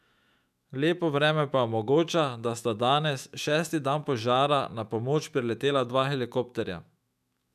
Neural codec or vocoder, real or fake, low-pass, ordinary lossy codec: autoencoder, 48 kHz, 128 numbers a frame, DAC-VAE, trained on Japanese speech; fake; 14.4 kHz; none